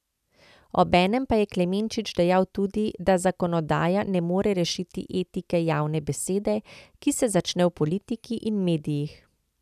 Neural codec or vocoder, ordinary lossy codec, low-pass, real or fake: none; none; 14.4 kHz; real